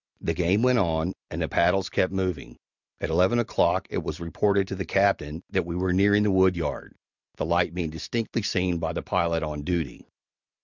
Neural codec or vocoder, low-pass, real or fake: none; 7.2 kHz; real